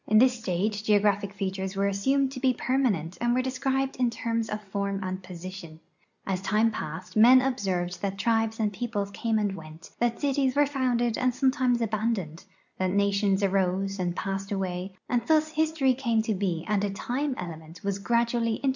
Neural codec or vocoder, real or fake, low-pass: none; real; 7.2 kHz